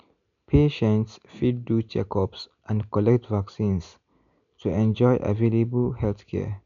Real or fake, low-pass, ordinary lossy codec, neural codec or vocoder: real; 7.2 kHz; none; none